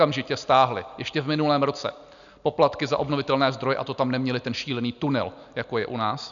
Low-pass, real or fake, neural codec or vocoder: 7.2 kHz; real; none